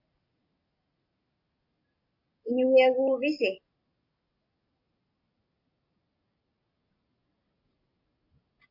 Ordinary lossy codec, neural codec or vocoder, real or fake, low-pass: MP3, 32 kbps; none; real; 5.4 kHz